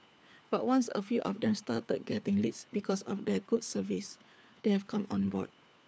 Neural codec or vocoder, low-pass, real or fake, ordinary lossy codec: codec, 16 kHz, 4 kbps, FunCodec, trained on LibriTTS, 50 frames a second; none; fake; none